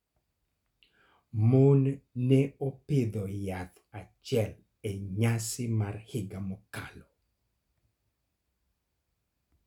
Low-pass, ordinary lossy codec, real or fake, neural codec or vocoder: 19.8 kHz; none; real; none